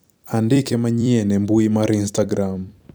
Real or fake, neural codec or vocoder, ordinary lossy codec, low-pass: fake; vocoder, 44.1 kHz, 128 mel bands every 256 samples, BigVGAN v2; none; none